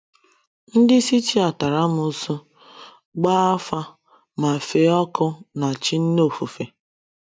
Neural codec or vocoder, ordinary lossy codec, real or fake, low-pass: none; none; real; none